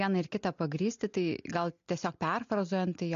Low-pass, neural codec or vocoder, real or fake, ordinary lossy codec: 7.2 kHz; none; real; MP3, 48 kbps